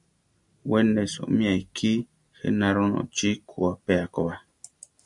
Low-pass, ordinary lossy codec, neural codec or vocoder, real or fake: 10.8 kHz; MP3, 96 kbps; none; real